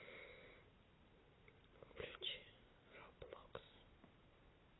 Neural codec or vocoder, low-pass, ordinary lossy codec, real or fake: none; 7.2 kHz; AAC, 16 kbps; real